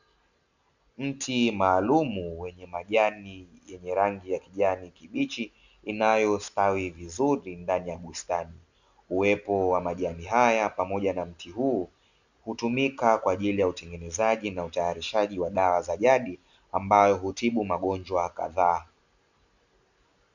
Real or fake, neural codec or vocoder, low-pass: real; none; 7.2 kHz